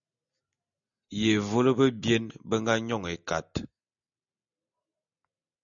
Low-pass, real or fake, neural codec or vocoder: 7.2 kHz; real; none